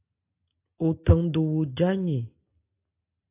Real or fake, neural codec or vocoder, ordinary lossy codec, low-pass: real; none; AAC, 24 kbps; 3.6 kHz